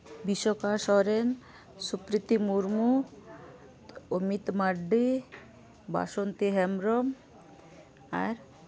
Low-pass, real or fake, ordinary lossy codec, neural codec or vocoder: none; real; none; none